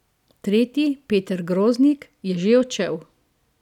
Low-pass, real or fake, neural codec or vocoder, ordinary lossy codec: 19.8 kHz; real; none; none